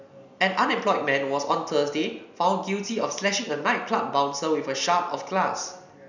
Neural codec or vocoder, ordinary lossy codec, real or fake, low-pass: none; none; real; 7.2 kHz